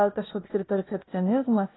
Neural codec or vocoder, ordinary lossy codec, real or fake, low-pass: codec, 16 kHz, 0.8 kbps, ZipCodec; AAC, 16 kbps; fake; 7.2 kHz